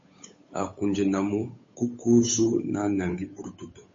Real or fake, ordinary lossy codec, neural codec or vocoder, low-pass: fake; MP3, 32 kbps; codec, 16 kHz, 8 kbps, FunCodec, trained on Chinese and English, 25 frames a second; 7.2 kHz